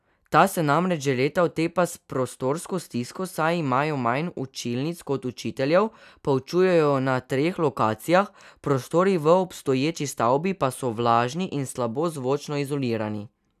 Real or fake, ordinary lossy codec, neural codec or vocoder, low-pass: real; none; none; 14.4 kHz